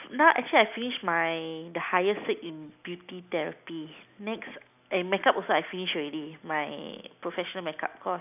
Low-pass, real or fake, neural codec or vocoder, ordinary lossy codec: 3.6 kHz; real; none; none